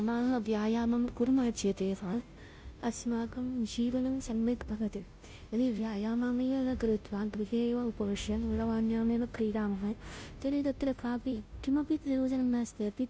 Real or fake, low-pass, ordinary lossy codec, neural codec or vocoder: fake; none; none; codec, 16 kHz, 0.5 kbps, FunCodec, trained on Chinese and English, 25 frames a second